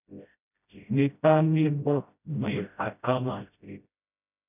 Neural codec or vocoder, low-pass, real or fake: codec, 16 kHz, 0.5 kbps, FreqCodec, smaller model; 3.6 kHz; fake